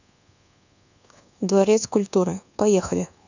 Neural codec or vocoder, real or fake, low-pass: codec, 24 kHz, 1.2 kbps, DualCodec; fake; 7.2 kHz